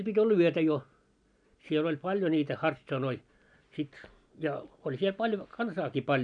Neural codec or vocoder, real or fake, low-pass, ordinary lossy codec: none; real; 9.9 kHz; none